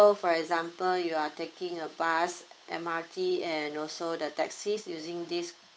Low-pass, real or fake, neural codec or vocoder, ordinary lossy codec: none; fake; codec, 16 kHz, 8 kbps, FunCodec, trained on Chinese and English, 25 frames a second; none